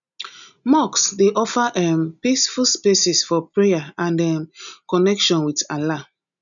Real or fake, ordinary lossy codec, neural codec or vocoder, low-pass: real; none; none; 7.2 kHz